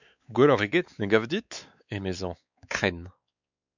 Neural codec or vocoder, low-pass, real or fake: codec, 16 kHz, 4 kbps, X-Codec, WavLM features, trained on Multilingual LibriSpeech; 7.2 kHz; fake